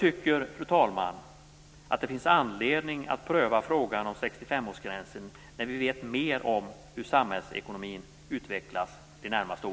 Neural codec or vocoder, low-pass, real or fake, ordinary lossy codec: none; none; real; none